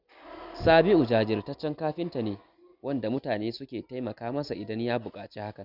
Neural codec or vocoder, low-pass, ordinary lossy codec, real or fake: none; 5.4 kHz; none; real